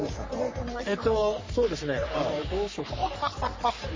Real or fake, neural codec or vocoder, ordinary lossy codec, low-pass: fake; codec, 44.1 kHz, 3.4 kbps, Pupu-Codec; MP3, 32 kbps; 7.2 kHz